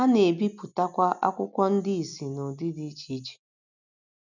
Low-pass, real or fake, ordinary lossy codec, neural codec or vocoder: 7.2 kHz; real; none; none